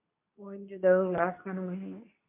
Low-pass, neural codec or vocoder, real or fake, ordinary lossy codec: 3.6 kHz; codec, 24 kHz, 0.9 kbps, WavTokenizer, medium speech release version 2; fake; MP3, 32 kbps